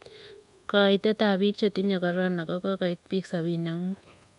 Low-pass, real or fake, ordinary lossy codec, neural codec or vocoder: 10.8 kHz; fake; MP3, 96 kbps; codec, 24 kHz, 1.2 kbps, DualCodec